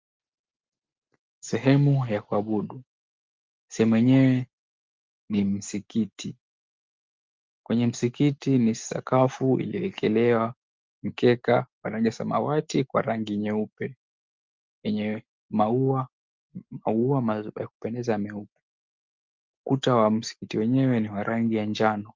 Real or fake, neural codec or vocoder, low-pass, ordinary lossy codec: real; none; 7.2 kHz; Opus, 32 kbps